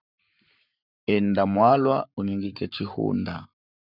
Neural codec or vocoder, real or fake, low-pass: codec, 44.1 kHz, 7.8 kbps, Pupu-Codec; fake; 5.4 kHz